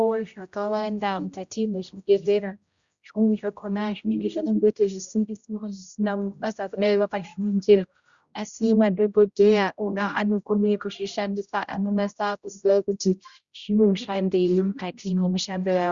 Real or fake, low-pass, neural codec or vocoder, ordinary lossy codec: fake; 7.2 kHz; codec, 16 kHz, 0.5 kbps, X-Codec, HuBERT features, trained on general audio; Opus, 64 kbps